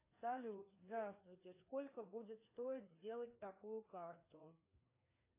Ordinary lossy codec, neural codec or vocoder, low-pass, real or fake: AAC, 24 kbps; codec, 16 kHz, 2 kbps, FreqCodec, larger model; 3.6 kHz; fake